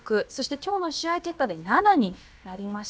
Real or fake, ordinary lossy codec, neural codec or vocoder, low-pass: fake; none; codec, 16 kHz, about 1 kbps, DyCAST, with the encoder's durations; none